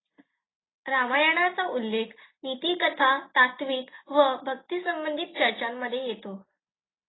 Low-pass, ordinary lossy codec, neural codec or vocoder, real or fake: 7.2 kHz; AAC, 16 kbps; none; real